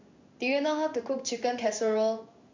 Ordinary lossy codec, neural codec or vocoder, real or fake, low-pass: MP3, 64 kbps; codec, 16 kHz in and 24 kHz out, 1 kbps, XY-Tokenizer; fake; 7.2 kHz